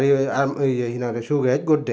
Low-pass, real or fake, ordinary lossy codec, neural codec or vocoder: none; real; none; none